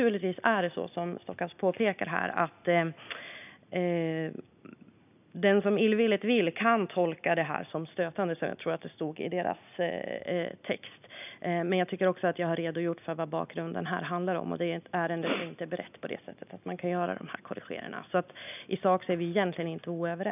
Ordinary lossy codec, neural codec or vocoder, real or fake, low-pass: none; none; real; 3.6 kHz